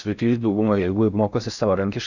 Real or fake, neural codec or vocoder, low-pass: fake; codec, 16 kHz in and 24 kHz out, 0.6 kbps, FocalCodec, streaming, 4096 codes; 7.2 kHz